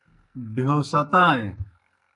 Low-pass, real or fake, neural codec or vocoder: 10.8 kHz; fake; codec, 44.1 kHz, 2.6 kbps, SNAC